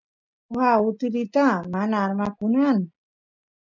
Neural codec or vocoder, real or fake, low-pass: none; real; 7.2 kHz